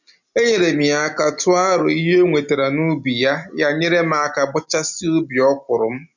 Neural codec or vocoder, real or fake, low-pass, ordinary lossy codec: none; real; 7.2 kHz; none